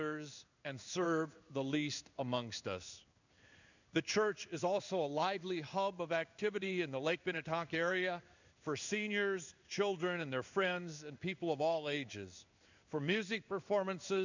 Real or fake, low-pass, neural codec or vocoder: fake; 7.2 kHz; codec, 16 kHz in and 24 kHz out, 1 kbps, XY-Tokenizer